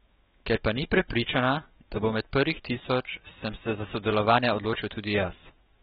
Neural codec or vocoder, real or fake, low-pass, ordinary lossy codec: autoencoder, 48 kHz, 128 numbers a frame, DAC-VAE, trained on Japanese speech; fake; 19.8 kHz; AAC, 16 kbps